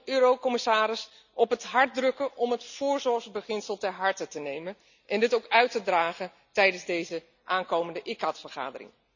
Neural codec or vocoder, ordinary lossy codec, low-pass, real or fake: none; none; 7.2 kHz; real